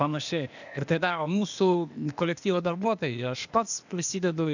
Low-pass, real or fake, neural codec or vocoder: 7.2 kHz; fake; codec, 16 kHz, 0.8 kbps, ZipCodec